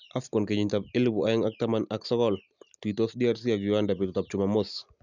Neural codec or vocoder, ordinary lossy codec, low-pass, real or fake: none; none; 7.2 kHz; real